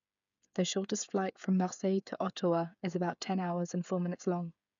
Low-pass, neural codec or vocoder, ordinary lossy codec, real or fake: 7.2 kHz; codec, 16 kHz, 8 kbps, FreqCodec, smaller model; none; fake